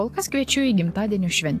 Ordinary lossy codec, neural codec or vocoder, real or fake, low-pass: AAC, 64 kbps; none; real; 14.4 kHz